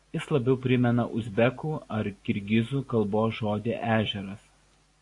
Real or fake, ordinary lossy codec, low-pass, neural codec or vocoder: real; AAC, 48 kbps; 10.8 kHz; none